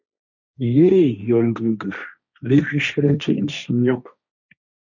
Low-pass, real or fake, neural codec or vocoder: 7.2 kHz; fake; codec, 16 kHz, 1.1 kbps, Voila-Tokenizer